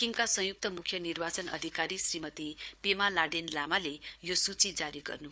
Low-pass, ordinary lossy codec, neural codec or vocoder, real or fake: none; none; codec, 16 kHz, 4 kbps, FunCodec, trained on Chinese and English, 50 frames a second; fake